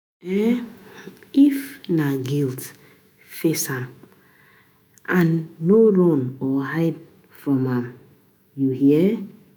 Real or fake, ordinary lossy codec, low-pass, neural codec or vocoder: fake; none; none; autoencoder, 48 kHz, 128 numbers a frame, DAC-VAE, trained on Japanese speech